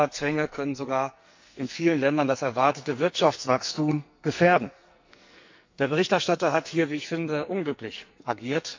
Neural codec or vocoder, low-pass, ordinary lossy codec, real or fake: codec, 44.1 kHz, 2.6 kbps, SNAC; 7.2 kHz; MP3, 64 kbps; fake